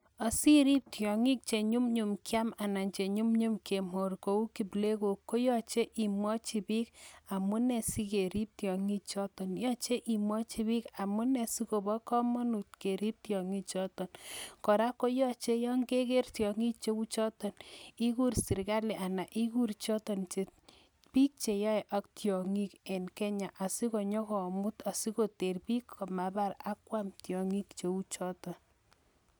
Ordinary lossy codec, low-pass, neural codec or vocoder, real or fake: none; none; none; real